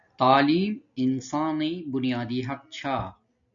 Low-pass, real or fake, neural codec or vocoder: 7.2 kHz; real; none